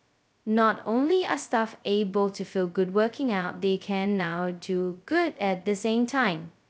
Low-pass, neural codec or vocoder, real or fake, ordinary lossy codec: none; codec, 16 kHz, 0.2 kbps, FocalCodec; fake; none